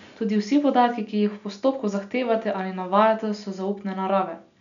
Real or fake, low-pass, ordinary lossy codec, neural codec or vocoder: real; 7.2 kHz; none; none